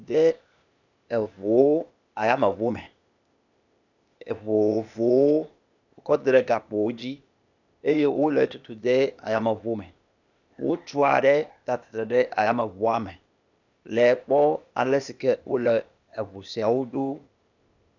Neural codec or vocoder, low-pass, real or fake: codec, 16 kHz, 0.8 kbps, ZipCodec; 7.2 kHz; fake